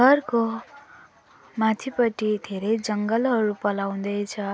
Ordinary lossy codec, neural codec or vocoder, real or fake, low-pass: none; none; real; none